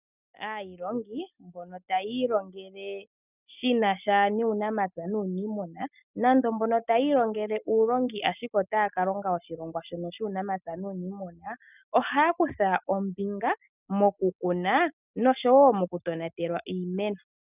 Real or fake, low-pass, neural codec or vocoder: real; 3.6 kHz; none